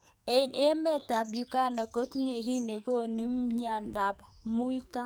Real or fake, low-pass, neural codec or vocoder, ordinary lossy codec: fake; none; codec, 44.1 kHz, 2.6 kbps, SNAC; none